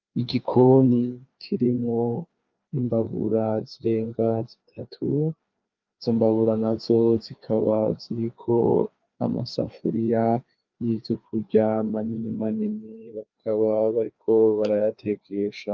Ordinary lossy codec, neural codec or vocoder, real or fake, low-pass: Opus, 24 kbps; codec, 16 kHz, 2 kbps, FreqCodec, larger model; fake; 7.2 kHz